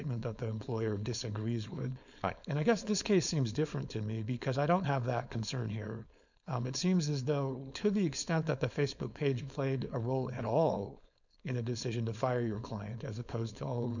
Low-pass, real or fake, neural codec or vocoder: 7.2 kHz; fake; codec, 16 kHz, 4.8 kbps, FACodec